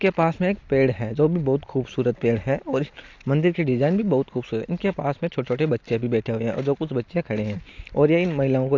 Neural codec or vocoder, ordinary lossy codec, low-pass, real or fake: none; AAC, 48 kbps; 7.2 kHz; real